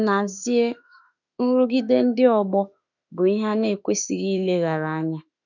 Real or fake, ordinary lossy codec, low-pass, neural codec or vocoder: fake; none; 7.2 kHz; autoencoder, 48 kHz, 32 numbers a frame, DAC-VAE, trained on Japanese speech